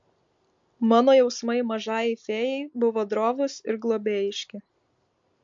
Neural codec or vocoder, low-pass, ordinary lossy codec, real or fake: none; 7.2 kHz; MP3, 48 kbps; real